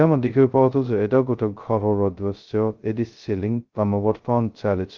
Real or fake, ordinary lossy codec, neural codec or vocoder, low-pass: fake; Opus, 32 kbps; codec, 16 kHz, 0.2 kbps, FocalCodec; 7.2 kHz